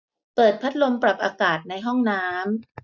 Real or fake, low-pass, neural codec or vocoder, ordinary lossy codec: real; 7.2 kHz; none; none